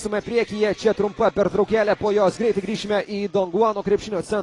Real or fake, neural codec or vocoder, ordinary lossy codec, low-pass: real; none; AAC, 32 kbps; 10.8 kHz